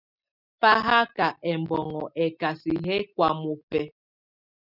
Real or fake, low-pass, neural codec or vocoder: real; 5.4 kHz; none